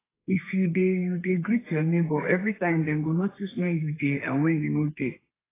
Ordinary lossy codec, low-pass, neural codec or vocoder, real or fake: AAC, 16 kbps; 3.6 kHz; codec, 32 kHz, 1.9 kbps, SNAC; fake